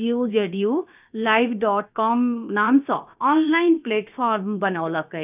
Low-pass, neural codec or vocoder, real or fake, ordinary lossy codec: 3.6 kHz; codec, 16 kHz, about 1 kbps, DyCAST, with the encoder's durations; fake; none